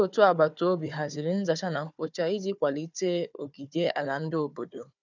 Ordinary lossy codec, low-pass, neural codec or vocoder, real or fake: none; 7.2 kHz; codec, 16 kHz, 4 kbps, FunCodec, trained on Chinese and English, 50 frames a second; fake